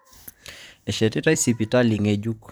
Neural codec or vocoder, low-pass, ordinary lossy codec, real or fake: vocoder, 44.1 kHz, 128 mel bands, Pupu-Vocoder; none; none; fake